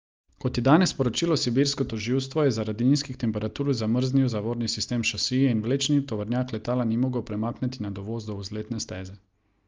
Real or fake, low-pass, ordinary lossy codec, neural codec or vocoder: real; 7.2 kHz; Opus, 32 kbps; none